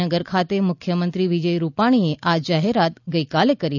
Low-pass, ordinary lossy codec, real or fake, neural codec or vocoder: 7.2 kHz; none; real; none